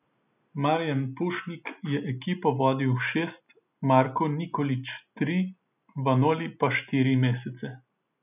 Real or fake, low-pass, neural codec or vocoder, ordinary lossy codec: real; 3.6 kHz; none; none